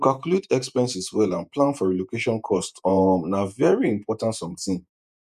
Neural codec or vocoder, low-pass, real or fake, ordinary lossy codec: vocoder, 48 kHz, 128 mel bands, Vocos; 14.4 kHz; fake; none